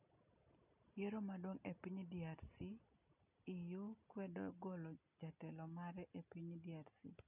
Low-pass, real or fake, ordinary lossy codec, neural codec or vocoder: 3.6 kHz; real; none; none